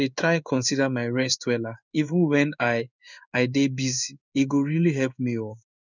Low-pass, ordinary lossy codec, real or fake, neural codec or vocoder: 7.2 kHz; none; fake; codec, 16 kHz in and 24 kHz out, 1 kbps, XY-Tokenizer